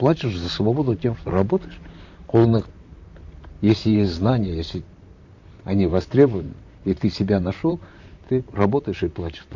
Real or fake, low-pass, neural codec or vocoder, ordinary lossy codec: fake; 7.2 kHz; vocoder, 44.1 kHz, 128 mel bands, Pupu-Vocoder; none